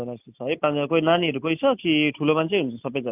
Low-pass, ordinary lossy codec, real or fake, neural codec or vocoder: 3.6 kHz; none; real; none